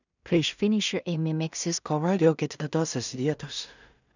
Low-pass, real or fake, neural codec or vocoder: 7.2 kHz; fake; codec, 16 kHz in and 24 kHz out, 0.4 kbps, LongCat-Audio-Codec, two codebook decoder